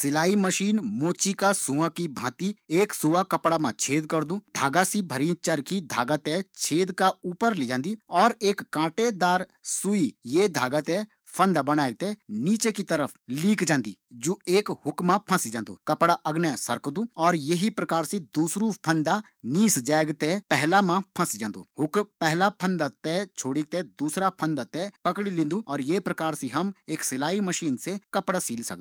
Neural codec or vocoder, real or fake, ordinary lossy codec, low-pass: codec, 44.1 kHz, 7.8 kbps, DAC; fake; none; none